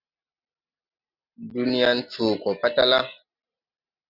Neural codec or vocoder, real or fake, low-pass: none; real; 5.4 kHz